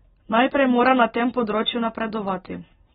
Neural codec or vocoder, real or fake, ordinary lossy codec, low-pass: none; real; AAC, 16 kbps; 7.2 kHz